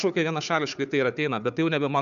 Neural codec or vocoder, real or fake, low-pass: codec, 16 kHz, 4 kbps, FunCodec, trained on Chinese and English, 50 frames a second; fake; 7.2 kHz